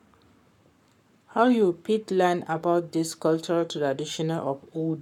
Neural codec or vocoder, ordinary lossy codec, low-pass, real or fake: codec, 44.1 kHz, 7.8 kbps, Pupu-Codec; none; 19.8 kHz; fake